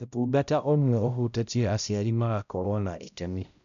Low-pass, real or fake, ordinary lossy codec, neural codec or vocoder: 7.2 kHz; fake; none; codec, 16 kHz, 0.5 kbps, X-Codec, HuBERT features, trained on balanced general audio